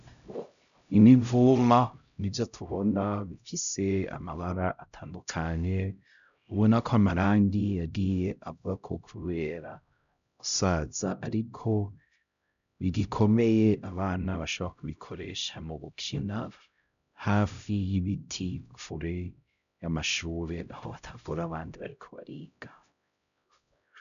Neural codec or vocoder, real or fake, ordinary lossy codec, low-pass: codec, 16 kHz, 0.5 kbps, X-Codec, HuBERT features, trained on LibriSpeech; fake; MP3, 96 kbps; 7.2 kHz